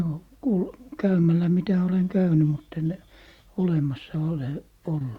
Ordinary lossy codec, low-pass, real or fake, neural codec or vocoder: Opus, 64 kbps; 19.8 kHz; real; none